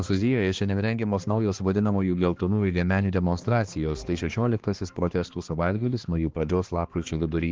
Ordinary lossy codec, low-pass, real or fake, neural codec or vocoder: Opus, 16 kbps; 7.2 kHz; fake; codec, 16 kHz, 2 kbps, X-Codec, HuBERT features, trained on balanced general audio